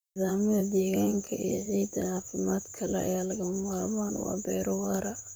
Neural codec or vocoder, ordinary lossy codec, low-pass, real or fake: vocoder, 44.1 kHz, 128 mel bands, Pupu-Vocoder; none; none; fake